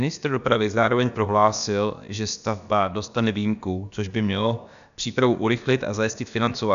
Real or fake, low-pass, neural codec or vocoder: fake; 7.2 kHz; codec, 16 kHz, about 1 kbps, DyCAST, with the encoder's durations